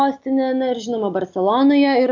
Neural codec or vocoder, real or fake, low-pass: none; real; 7.2 kHz